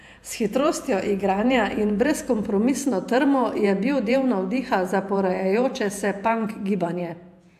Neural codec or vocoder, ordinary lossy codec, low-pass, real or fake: vocoder, 48 kHz, 128 mel bands, Vocos; AAC, 96 kbps; 14.4 kHz; fake